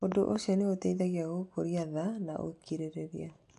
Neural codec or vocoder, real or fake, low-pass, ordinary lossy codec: none; real; 10.8 kHz; AAC, 64 kbps